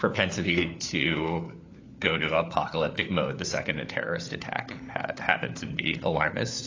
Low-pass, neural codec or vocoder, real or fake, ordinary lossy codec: 7.2 kHz; codec, 16 kHz, 4 kbps, FunCodec, trained on LibriTTS, 50 frames a second; fake; AAC, 32 kbps